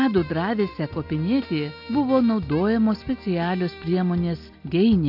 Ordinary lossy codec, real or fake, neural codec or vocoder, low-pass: AAC, 48 kbps; real; none; 5.4 kHz